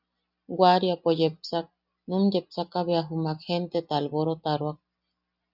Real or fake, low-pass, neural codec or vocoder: real; 5.4 kHz; none